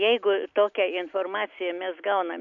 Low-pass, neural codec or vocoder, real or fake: 7.2 kHz; none; real